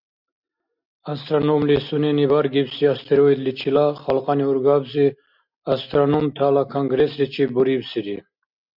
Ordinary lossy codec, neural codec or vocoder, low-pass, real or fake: MP3, 48 kbps; none; 5.4 kHz; real